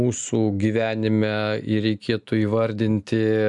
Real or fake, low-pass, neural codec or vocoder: real; 10.8 kHz; none